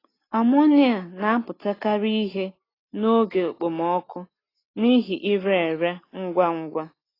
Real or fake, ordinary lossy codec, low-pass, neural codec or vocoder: real; AAC, 24 kbps; 5.4 kHz; none